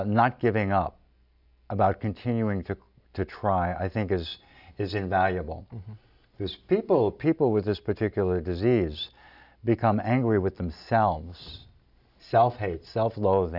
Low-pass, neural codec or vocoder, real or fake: 5.4 kHz; none; real